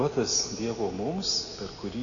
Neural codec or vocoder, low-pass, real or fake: none; 7.2 kHz; real